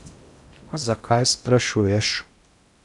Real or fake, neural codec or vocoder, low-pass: fake; codec, 16 kHz in and 24 kHz out, 0.6 kbps, FocalCodec, streaming, 2048 codes; 10.8 kHz